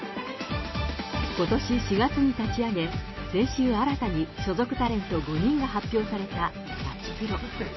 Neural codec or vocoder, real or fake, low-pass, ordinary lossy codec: none; real; 7.2 kHz; MP3, 24 kbps